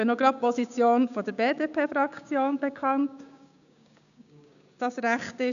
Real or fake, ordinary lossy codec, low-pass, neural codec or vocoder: fake; none; 7.2 kHz; codec, 16 kHz, 6 kbps, DAC